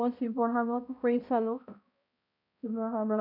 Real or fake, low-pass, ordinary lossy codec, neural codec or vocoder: fake; 5.4 kHz; none; codec, 16 kHz, 0.5 kbps, X-Codec, HuBERT features, trained on balanced general audio